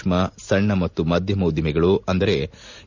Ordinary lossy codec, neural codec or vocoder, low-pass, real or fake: Opus, 64 kbps; none; 7.2 kHz; real